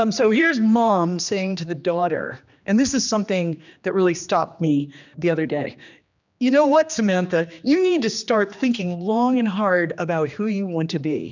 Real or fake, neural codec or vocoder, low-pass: fake; codec, 16 kHz, 2 kbps, X-Codec, HuBERT features, trained on general audio; 7.2 kHz